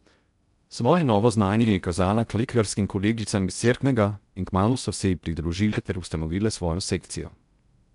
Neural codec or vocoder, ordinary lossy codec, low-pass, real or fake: codec, 16 kHz in and 24 kHz out, 0.6 kbps, FocalCodec, streaming, 2048 codes; none; 10.8 kHz; fake